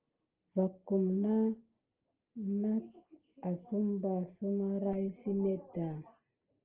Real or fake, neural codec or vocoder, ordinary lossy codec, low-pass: real; none; Opus, 16 kbps; 3.6 kHz